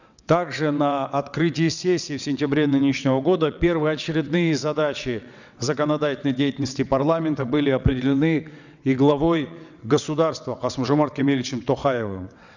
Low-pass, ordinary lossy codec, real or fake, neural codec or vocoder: 7.2 kHz; none; fake; vocoder, 22.05 kHz, 80 mel bands, WaveNeXt